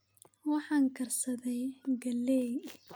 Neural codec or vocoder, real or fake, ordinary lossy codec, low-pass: none; real; none; none